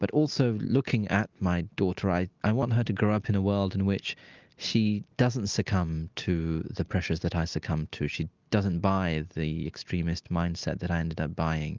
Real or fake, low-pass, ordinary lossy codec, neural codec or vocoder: real; 7.2 kHz; Opus, 24 kbps; none